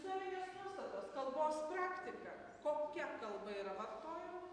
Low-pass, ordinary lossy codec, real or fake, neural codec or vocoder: 9.9 kHz; AAC, 48 kbps; real; none